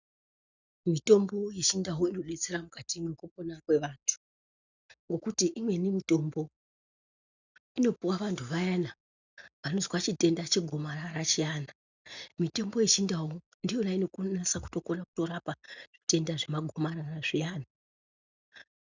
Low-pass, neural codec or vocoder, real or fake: 7.2 kHz; none; real